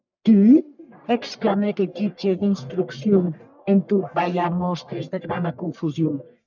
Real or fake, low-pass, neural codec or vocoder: fake; 7.2 kHz; codec, 44.1 kHz, 1.7 kbps, Pupu-Codec